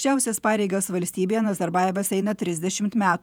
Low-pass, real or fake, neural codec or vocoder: 19.8 kHz; fake; vocoder, 48 kHz, 128 mel bands, Vocos